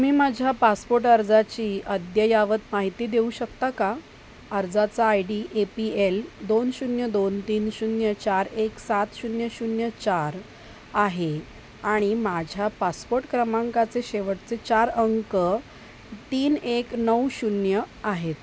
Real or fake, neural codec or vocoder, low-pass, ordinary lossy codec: real; none; none; none